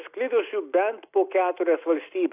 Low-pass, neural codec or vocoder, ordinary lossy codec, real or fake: 3.6 kHz; none; AAC, 32 kbps; real